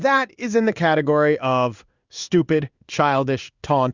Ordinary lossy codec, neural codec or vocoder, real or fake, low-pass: Opus, 64 kbps; codec, 16 kHz in and 24 kHz out, 1 kbps, XY-Tokenizer; fake; 7.2 kHz